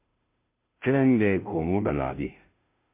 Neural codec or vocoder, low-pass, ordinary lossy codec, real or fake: codec, 16 kHz, 0.5 kbps, FunCodec, trained on Chinese and English, 25 frames a second; 3.6 kHz; MP3, 24 kbps; fake